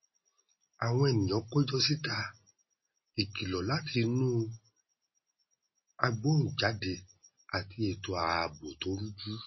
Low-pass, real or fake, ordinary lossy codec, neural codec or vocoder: 7.2 kHz; real; MP3, 24 kbps; none